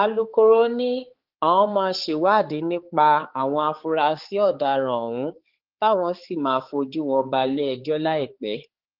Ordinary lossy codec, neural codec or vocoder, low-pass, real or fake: Opus, 16 kbps; codec, 16 kHz, 4 kbps, X-Codec, HuBERT features, trained on balanced general audio; 5.4 kHz; fake